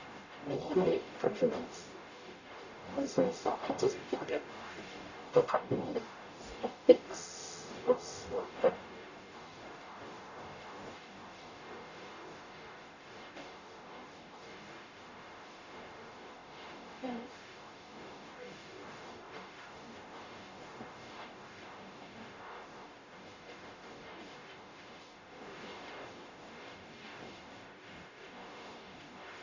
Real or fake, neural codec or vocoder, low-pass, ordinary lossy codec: fake; codec, 44.1 kHz, 0.9 kbps, DAC; 7.2 kHz; none